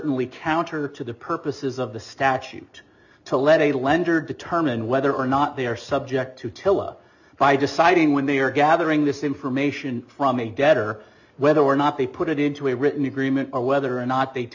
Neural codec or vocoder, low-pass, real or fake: none; 7.2 kHz; real